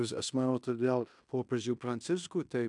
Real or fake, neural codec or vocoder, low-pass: fake; codec, 16 kHz in and 24 kHz out, 0.9 kbps, LongCat-Audio-Codec, fine tuned four codebook decoder; 10.8 kHz